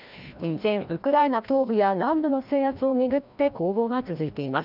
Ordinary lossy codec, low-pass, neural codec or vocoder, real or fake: none; 5.4 kHz; codec, 16 kHz, 1 kbps, FreqCodec, larger model; fake